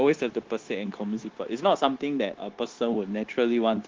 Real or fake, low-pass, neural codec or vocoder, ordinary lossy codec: fake; 7.2 kHz; codec, 16 kHz, 0.9 kbps, LongCat-Audio-Codec; Opus, 16 kbps